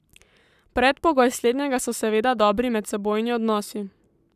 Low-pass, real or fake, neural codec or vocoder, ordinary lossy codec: 14.4 kHz; fake; codec, 44.1 kHz, 7.8 kbps, Pupu-Codec; none